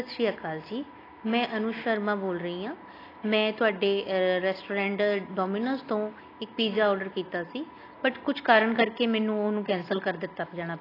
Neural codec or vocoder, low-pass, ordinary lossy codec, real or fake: none; 5.4 kHz; AAC, 24 kbps; real